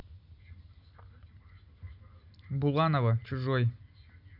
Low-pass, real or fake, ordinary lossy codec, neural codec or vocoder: 5.4 kHz; real; none; none